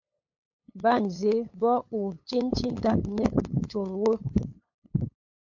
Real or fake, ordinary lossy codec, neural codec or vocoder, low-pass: fake; MP3, 48 kbps; codec, 16 kHz, 8 kbps, FunCodec, trained on LibriTTS, 25 frames a second; 7.2 kHz